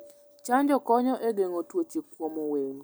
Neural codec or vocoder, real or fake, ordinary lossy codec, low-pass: none; real; none; none